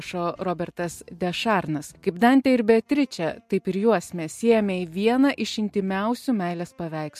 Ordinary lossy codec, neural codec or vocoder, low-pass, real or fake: MP3, 64 kbps; none; 14.4 kHz; real